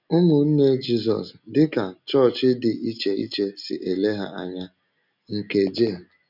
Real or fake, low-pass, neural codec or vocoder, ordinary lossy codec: real; 5.4 kHz; none; none